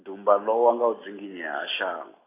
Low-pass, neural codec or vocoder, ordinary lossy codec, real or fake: 3.6 kHz; none; AAC, 16 kbps; real